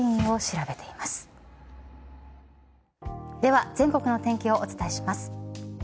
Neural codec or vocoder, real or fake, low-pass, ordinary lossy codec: none; real; none; none